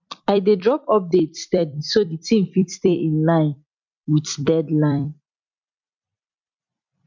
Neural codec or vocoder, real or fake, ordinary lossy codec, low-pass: vocoder, 44.1 kHz, 128 mel bands every 256 samples, BigVGAN v2; fake; MP3, 64 kbps; 7.2 kHz